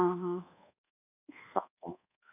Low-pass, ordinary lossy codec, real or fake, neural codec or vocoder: 3.6 kHz; none; fake; codec, 24 kHz, 1.2 kbps, DualCodec